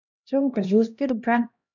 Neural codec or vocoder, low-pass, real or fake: codec, 16 kHz, 1 kbps, X-Codec, HuBERT features, trained on LibriSpeech; 7.2 kHz; fake